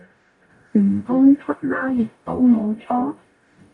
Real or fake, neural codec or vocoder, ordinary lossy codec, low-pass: fake; codec, 44.1 kHz, 0.9 kbps, DAC; MP3, 96 kbps; 10.8 kHz